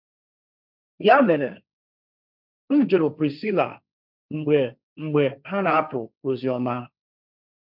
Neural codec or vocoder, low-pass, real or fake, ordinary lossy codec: codec, 16 kHz, 1.1 kbps, Voila-Tokenizer; 5.4 kHz; fake; none